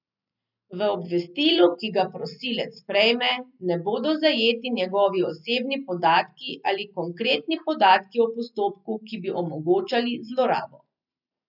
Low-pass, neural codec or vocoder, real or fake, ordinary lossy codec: 5.4 kHz; none; real; none